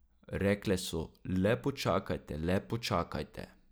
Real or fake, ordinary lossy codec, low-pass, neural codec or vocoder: real; none; none; none